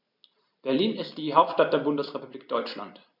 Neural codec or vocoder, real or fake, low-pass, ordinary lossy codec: vocoder, 44.1 kHz, 128 mel bands every 256 samples, BigVGAN v2; fake; 5.4 kHz; none